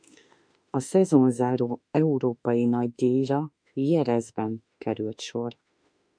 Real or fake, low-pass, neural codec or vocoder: fake; 9.9 kHz; autoencoder, 48 kHz, 32 numbers a frame, DAC-VAE, trained on Japanese speech